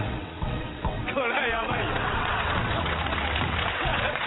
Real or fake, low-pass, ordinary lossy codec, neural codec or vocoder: fake; 7.2 kHz; AAC, 16 kbps; vocoder, 22.05 kHz, 80 mel bands, WaveNeXt